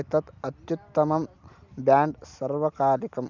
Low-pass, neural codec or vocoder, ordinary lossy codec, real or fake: 7.2 kHz; none; none; real